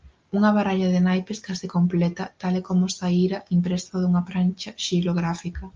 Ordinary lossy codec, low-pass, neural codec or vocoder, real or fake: Opus, 24 kbps; 7.2 kHz; none; real